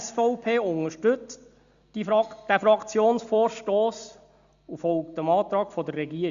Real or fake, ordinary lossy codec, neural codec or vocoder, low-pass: real; Opus, 64 kbps; none; 7.2 kHz